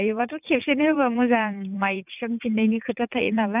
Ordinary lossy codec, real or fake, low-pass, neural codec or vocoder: none; fake; 3.6 kHz; vocoder, 44.1 kHz, 80 mel bands, Vocos